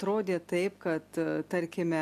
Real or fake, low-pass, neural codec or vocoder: real; 14.4 kHz; none